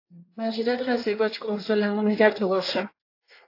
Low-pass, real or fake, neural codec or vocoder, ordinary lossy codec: 5.4 kHz; fake; codec, 24 kHz, 1 kbps, SNAC; AAC, 32 kbps